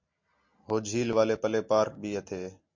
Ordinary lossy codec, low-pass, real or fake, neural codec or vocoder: MP3, 48 kbps; 7.2 kHz; real; none